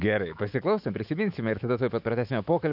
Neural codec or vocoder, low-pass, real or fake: autoencoder, 48 kHz, 128 numbers a frame, DAC-VAE, trained on Japanese speech; 5.4 kHz; fake